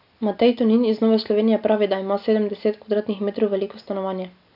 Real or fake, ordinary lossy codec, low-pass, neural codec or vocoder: real; none; 5.4 kHz; none